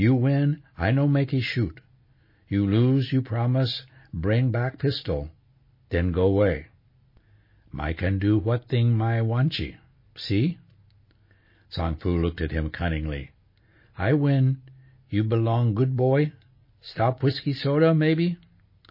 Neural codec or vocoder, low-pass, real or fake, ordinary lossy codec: none; 5.4 kHz; real; MP3, 24 kbps